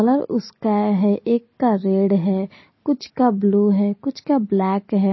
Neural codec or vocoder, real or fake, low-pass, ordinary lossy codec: none; real; 7.2 kHz; MP3, 24 kbps